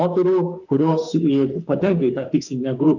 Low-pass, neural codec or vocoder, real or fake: 7.2 kHz; autoencoder, 48 kHz, 32 numbers a frame, DAC-VAE, trained on Japanese speech; fake